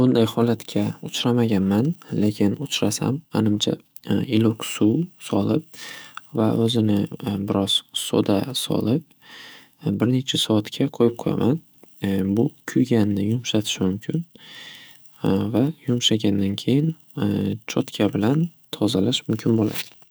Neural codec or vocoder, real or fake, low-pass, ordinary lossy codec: autoencoder, 48 kHz, 128 numbers a frame, DAC-VAE, trained on Japanese speech; fake; none; none